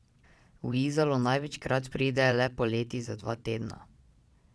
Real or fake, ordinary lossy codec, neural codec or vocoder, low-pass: fake; none; vocoder, 22.05 kHz, 80 mel bands, Vocos; none